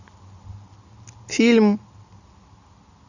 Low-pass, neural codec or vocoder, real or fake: 7.2 kHz; none; real